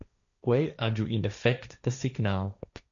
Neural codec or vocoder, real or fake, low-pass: codec, 16 kHz, 1.1 kbps, Voila-Tokenizer; fake; 7.2 kHz